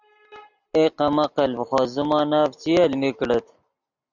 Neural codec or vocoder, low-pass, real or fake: none; 7.2 kHz; real